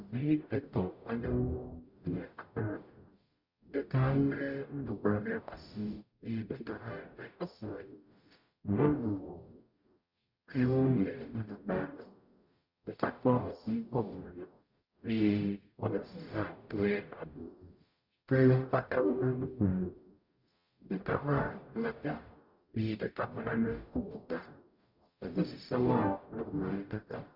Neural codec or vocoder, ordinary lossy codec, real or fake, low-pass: codec, 44.1 kHz, 0.9 kbps, DAC; Opus, 64 kbps; fake; 5.4 kHz